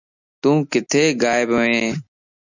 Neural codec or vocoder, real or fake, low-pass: none; real; 7.2 kHz